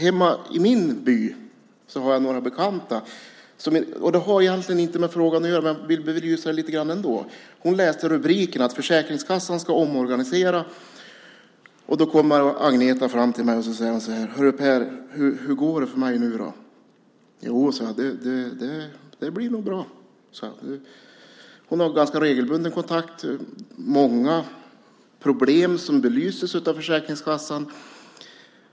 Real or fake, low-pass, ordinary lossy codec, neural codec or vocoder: real; none; none; none